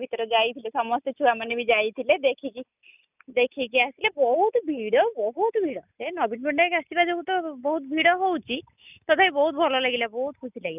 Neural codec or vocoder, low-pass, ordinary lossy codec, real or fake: none; 3.6 kHz; none; real